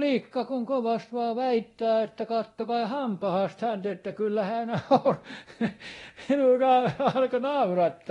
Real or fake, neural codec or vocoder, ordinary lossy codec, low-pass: fake; codec, 24 kHz, 0.9 kbps, DualCodec; AAC, 32 kbps; 10.8 kHz